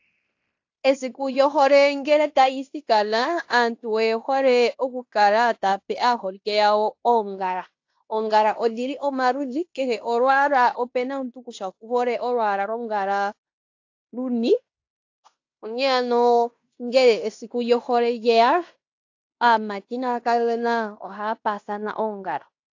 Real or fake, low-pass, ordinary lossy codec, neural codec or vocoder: fake; 7.2 kHz; AAC, 48 kbps; codec, 16 kHz in and 24 kHz out, 0.9 kbps, LongCat-Audio-Codec, fine tuned four codebook decoder